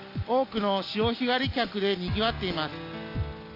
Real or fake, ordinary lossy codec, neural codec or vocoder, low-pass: real; AAC, 32 kbps; none; 5.4 kHz